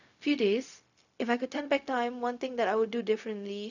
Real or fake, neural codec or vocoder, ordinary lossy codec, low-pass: fake; codec, 16 kHz, 0.4 kbps, LongCat-Audio-Codec; none; 7.2 kHz